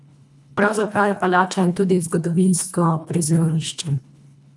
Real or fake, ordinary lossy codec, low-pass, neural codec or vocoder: fake; none; none; codec, 24 kHz, 1.5 kbps, HILCodec